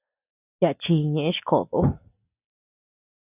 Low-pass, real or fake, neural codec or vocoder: 3.6 kHz; real; none